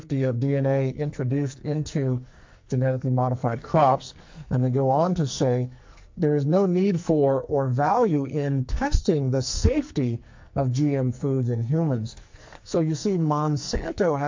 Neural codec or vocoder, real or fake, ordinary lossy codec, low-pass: codec, 44.1 kHz, 2.6 kbps, SNAC; fake; MP3, 48 kbps; 7.2 kHz